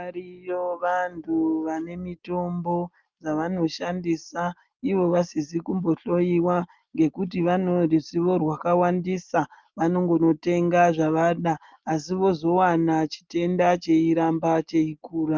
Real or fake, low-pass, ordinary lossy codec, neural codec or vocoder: real; 7.2 kHz; Opus, 32 kbps; none